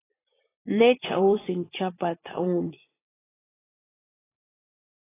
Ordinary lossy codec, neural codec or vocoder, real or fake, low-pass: AAC, 16 kbps; vocoder, 44.1 kHz, 80 mel bands, Vocos; fake; 3.6 kHz